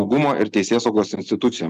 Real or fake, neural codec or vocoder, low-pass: real; none; 14.4 kHz